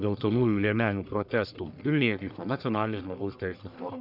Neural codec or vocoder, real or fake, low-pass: codec, 44.1 kHz, 1.7 kbps, Pupu-Codec; fake; 5.4 kHz